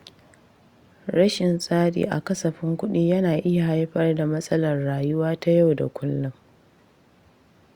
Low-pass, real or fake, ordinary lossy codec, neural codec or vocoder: 19.8 kHz; real; Opus, 64 kbps; none